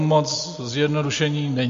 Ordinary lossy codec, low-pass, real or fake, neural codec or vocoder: MP3, 48 kbps; 7.2 kHz; real; none